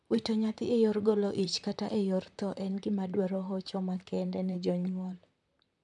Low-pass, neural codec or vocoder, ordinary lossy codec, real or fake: 10.8 kHz; vocoder, 44.1 kHz, 128 mel bands, Pupu-Vocoder; none; fake